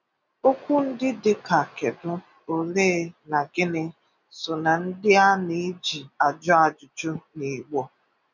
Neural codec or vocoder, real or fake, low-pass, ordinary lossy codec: none; real; 7.2 kHz; none